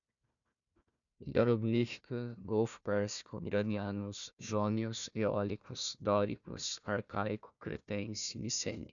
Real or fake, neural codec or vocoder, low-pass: fake; codec, 16 kHz, 1 kbps, FunCodec, trained on Chinese and English, 50 frames a second; 7.2 kHz